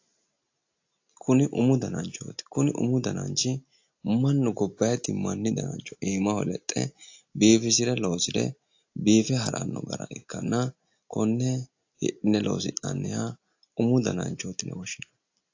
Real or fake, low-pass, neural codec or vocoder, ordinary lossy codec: real; 7.2 kHz; none; AAC, 48 kbps